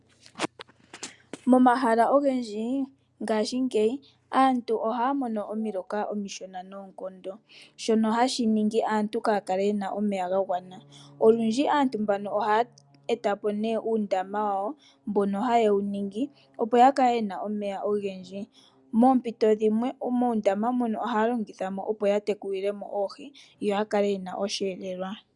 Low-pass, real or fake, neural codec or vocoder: 10.8 kHz; real; none